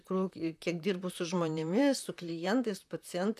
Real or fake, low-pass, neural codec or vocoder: fake; 14.4 kHz; vocoder, 44.1 kHz, 128 mel bands, Pupu-Vocoder